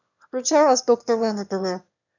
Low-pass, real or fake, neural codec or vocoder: 7.2 kHz; fake; autoencoder, 22.05 kHz, a latent of 192 numbers a frame, VITS, trained on one speaker